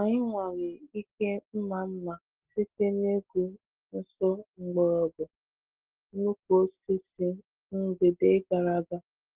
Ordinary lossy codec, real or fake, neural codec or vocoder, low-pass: Opus, 16 kbps; real; none; 3.6 kHz